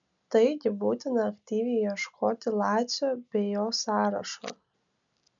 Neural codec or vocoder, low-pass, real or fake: none; 7.2 kHz; real